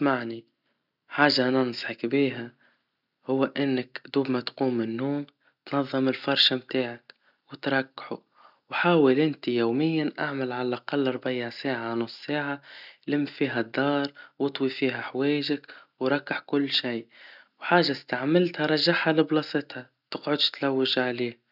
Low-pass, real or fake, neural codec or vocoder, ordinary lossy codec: 5.4 kHz; real; none; none